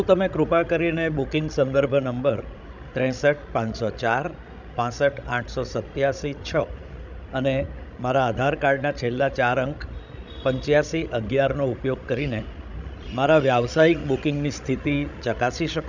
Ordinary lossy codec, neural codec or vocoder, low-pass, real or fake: none; codec, 16 kHz, 16 kbps, FreqCodec, larger model; 7.2 kHz; fake